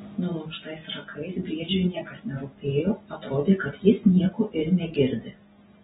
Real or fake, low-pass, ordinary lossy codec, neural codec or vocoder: real; 10.8 kHz; AAC, 16 kbps; none